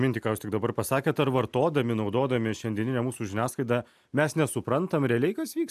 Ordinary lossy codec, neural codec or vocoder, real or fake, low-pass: AAC, 96 kbps; none; real; 14.4 kHz